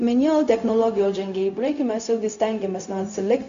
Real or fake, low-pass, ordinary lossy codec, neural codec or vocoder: fake; 7.2 kHz; AAC, 64 kbps; codec, 16 kHz, 0.4 kbps, LongCat-Audio-Codec